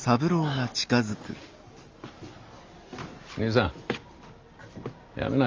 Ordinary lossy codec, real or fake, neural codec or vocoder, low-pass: Opus, 32 kbps; real; none; 7.2 kHz